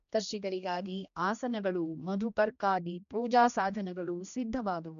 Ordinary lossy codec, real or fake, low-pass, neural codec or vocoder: none; fake; 7.2 kHz; codec, 16 kHz, 1 kbps, X-Codec, HuBERT features, trained on general audio